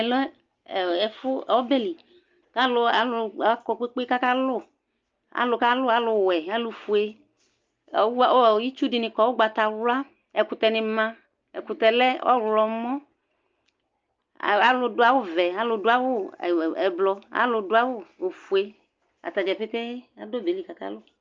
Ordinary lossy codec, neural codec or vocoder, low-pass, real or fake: Opus, 24 kbps; none; 7.2 kHz; real